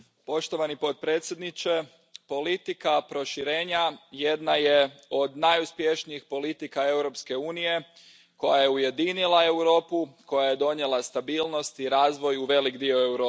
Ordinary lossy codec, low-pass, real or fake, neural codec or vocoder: none; none; real; none